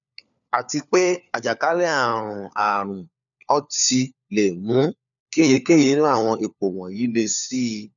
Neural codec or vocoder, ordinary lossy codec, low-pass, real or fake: codec, 16 kHz, 4 kbps, FunCodec, trained on LibriTTS, 50 frames a second; none; 7.2 kHz; fake